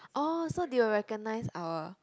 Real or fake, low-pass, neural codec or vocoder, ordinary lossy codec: real; none; none; none